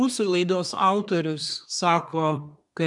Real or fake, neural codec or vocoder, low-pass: fake; codec, 24 kHz, 1 kbps, SNAC; 10.8 kHz